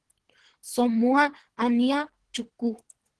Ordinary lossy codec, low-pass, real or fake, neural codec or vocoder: Opus, 16 kbps; 10.8 kHz; fake; codec, 24 kHz, 3 kbps, HILCodec